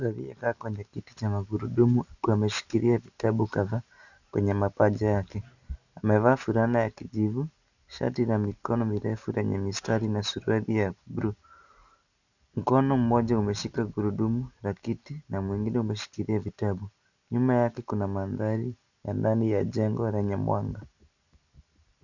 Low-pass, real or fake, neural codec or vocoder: 7.2 kHz; real; none